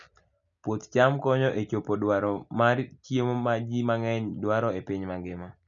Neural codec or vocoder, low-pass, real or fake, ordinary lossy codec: none; 7.2 kHz; real; none